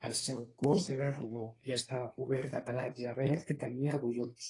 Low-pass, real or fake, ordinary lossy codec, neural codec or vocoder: 10.8 kHz; fake; AAC, 32 kbps; codec, 24 kHz, 1 kbps, SNAC